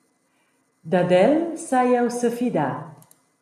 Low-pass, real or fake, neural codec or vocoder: 14.4 kHz; real; none